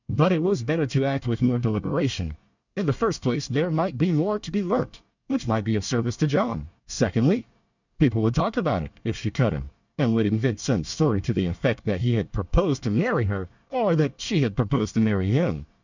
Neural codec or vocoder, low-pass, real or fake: codec, 24 kHz, 1 kbps, SNAC; 7.2 kHz; fake